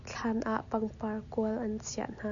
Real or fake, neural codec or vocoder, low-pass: real; none; 7.2 kHz